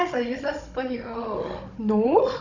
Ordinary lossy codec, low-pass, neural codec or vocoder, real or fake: none; 7.2 kHz; codec, 16 kHz, 16 kbps, FunCodec, trained on Chinese and English, 50 frames a second; fake